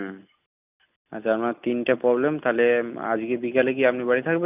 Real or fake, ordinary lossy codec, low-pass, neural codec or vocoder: real; none; 3.6 kHz; none